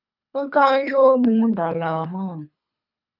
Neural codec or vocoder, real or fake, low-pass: codec, 24 kHz, 3 kbps, HILCodec; fake; 5.4 kHz